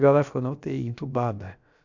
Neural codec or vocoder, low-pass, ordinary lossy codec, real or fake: codec, 16 kHz, about 1 kbps, DyCAST, with the encoder's durations; 7.2 kHz; none; fake